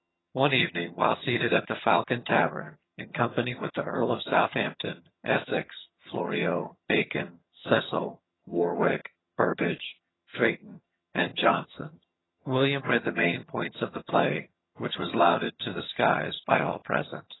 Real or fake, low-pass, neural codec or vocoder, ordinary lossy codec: fake; 7.2 kHz; vocoder, 22.05 kHz, 80 mel bands, HiFi-GAN; AAC, 16 kbps